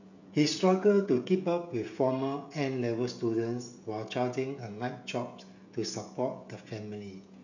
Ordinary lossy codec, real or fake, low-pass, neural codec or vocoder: none; fake; 7.2 kHz; codec, 16 kHz, 16 kbps, FreqCodec, smaller model